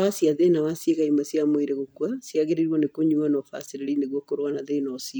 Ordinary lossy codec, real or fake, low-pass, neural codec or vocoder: none; real; none; none